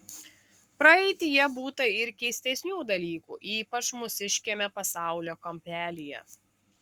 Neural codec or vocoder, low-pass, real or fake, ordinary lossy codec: codec, 44.1 kHz, 7.8 kbps, Pupu-Codec; 19.8 kHz; fake; Opus, 64 kbps